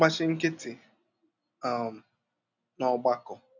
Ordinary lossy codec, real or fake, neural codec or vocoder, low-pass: none; real; none; 7.2 kHz